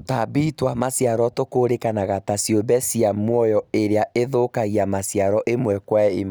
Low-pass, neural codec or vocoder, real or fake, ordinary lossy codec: none; none; real; none